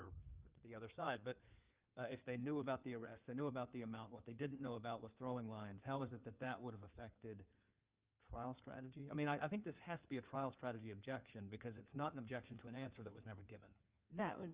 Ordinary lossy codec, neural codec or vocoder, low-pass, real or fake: Opus, 32 kbps; codec, 16 kHz in and 24 kHz out, 2.2 kbps, FireRedTTS-2 codec; 3.6 kHz; fake